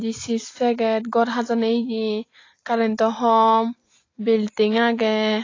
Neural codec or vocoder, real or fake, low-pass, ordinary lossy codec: none; real; 7.2 kHz; AAC, 32 kbps